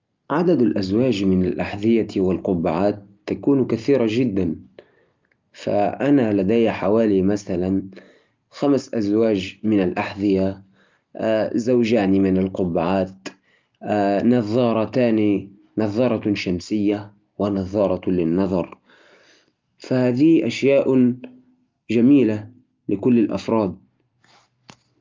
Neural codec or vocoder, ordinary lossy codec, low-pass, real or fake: none; Opus, 24 kbps; 7.2 kHz; real